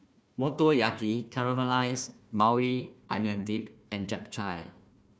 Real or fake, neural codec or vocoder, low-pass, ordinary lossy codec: fake; codec, 16 kHz, 1 kbps, FunCodec, trained on Chinese and English, 50 frames a second; none; none